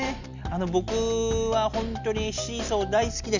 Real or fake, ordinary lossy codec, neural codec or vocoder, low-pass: real; Opus, 64 kbps; none; 7.2 kHz